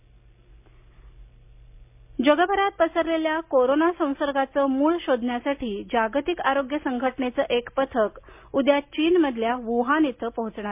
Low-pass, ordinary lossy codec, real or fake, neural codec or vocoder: 3.6 kHz; MP3, 32 kbps; real; none